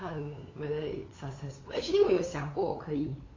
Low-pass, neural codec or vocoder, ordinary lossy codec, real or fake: 7.2 kHz; codec, 16 kHz, 8 kbps, FunCodec, trained on LibriTTS, 25 frames a second; AAC, 32 kbps; fake